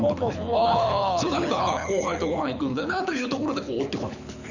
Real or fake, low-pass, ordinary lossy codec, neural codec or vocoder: fake; 7.2 kHz; none; codec, 24 kHz, 6 kbps, HILCodec